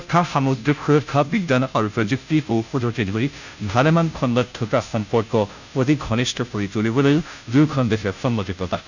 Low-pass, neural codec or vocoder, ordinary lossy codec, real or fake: 7.2 kHz; codec, 16 kHz, 0.5 kbps, FunCodec, trained on Chinese and English, 25 frames a second; none; fake